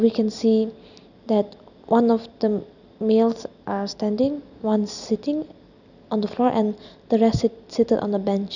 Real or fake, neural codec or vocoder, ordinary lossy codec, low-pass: real; none; none; 7.2 kHz